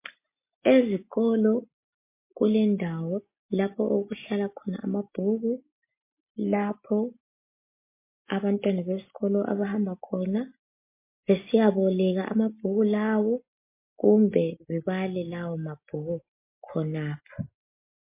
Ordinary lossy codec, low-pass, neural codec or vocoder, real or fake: MP3, 24 kbps; 3.6 kHz; none; real